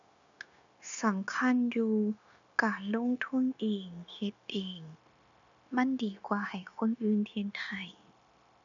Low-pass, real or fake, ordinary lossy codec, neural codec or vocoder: 7.2 kHz; fake; none; codec, 16 kHz, 0.9 kbps, LongCat-Audio-Codec